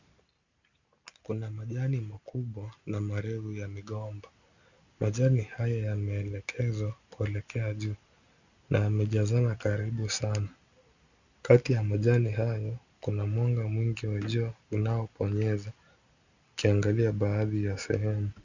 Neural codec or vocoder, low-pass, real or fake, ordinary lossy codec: none; 7.2 kHz; real; Opus, 64 kbps